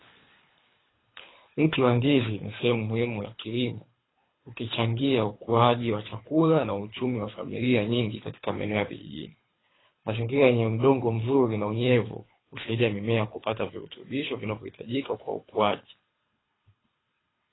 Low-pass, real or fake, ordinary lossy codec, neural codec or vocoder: 7.2 kHz; fake; AAC, 16 kbps; codec, 24 kHz, 3 kbps, HILCodec